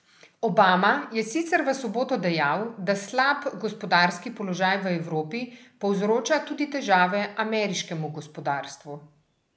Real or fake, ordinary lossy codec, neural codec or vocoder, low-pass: real; none; none; none